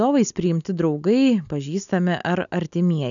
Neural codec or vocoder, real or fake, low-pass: none; real; 7.2 kHz